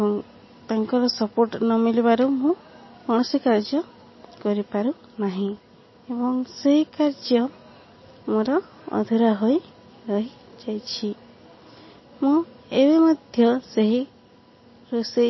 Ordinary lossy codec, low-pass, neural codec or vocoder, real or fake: MP3, 24 kbps; 7.2 kHz; none; real